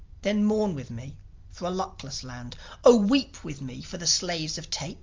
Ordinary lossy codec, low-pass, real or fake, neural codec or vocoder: Opus, 32 kbps; 7.2 kHz; real; none